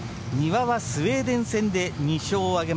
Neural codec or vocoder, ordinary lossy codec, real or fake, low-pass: none; none; real; none